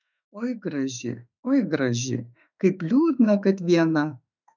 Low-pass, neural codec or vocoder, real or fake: 7.2 kHz; autoencoder, 48 kHz, 32 numbers a frame, DAC-VAE, trained on Japanese speech; fake